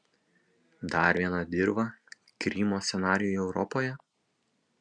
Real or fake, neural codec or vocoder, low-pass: real; none; 9.9 kHz